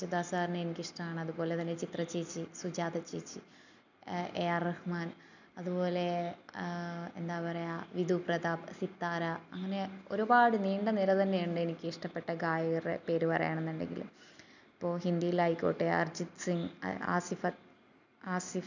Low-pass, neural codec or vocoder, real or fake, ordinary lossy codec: 7.2 kHz; none; real; none